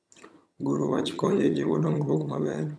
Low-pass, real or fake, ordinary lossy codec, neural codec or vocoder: none; fake; none; vocoder, 22.05 kHz, 80 mel bands, HiFi-GAN